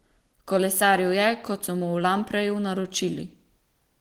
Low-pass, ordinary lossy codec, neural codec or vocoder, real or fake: 19.8 kHz; Opus, 16 kbps; none; real